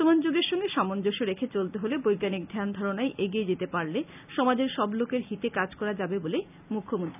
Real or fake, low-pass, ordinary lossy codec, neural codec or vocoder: real; 3.6 kHz; none; none